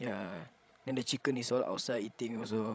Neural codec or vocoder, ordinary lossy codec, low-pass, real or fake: codec, 16 kHz, 16 kbps, FreqCodec, larger model; none; none; fake